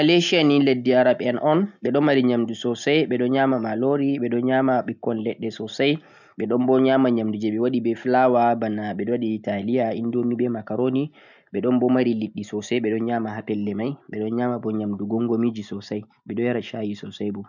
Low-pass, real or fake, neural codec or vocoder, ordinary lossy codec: 7.2 kHz; real; none; none